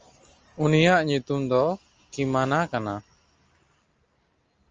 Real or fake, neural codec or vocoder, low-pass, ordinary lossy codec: real; none; 7.2 kHz; Opus, 24 kbps